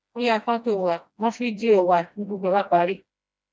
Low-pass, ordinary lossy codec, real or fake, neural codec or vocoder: none; none; fake; codec, 16 kHz, 1 kbps, FreqCodec, smaller model